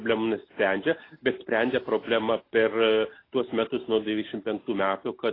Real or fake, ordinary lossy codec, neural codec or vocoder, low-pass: real; AAC, 24 kbps; none; 5.4 kHz